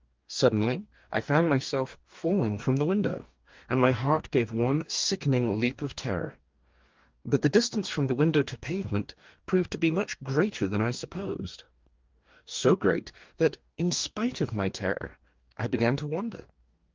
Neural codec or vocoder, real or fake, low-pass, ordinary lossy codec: codec, 44.1 kHz, 2.6 kbps, DAC; fake; 7.2 kHz; Opus, 32 kbps